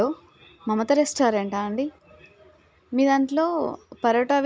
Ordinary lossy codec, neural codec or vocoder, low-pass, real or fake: none; none; none; real